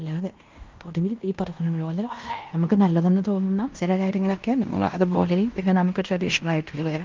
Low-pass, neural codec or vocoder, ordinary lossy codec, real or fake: 7.2 kHz; codec, 16 kHz in and 24 kHz out, 0.9 kbps, LongCat-Audio-Codec, fine tuned four codebook decoder; Opus, 24 kbps; fake